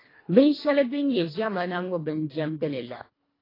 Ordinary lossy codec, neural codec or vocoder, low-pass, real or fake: AAC, 24 kbps; codec, 24 kHz, 1.5 kbps, HILCodec; 5.4 kHz; fake